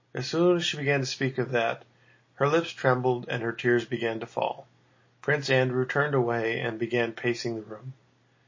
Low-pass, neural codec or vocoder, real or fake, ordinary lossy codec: 7.2 kHz; none; real; MP3, 32 kbps